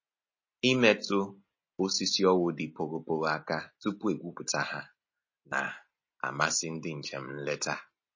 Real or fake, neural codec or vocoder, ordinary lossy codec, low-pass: real; none; MP3, 32 kbps; 7.2 kHz